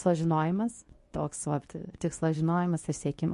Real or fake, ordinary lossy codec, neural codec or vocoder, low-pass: fake; MP3, 48 kbps; codec, 24 kHz, 0.9 kbps, WavTokenizer, medium speech release version 2; 10.8 kHz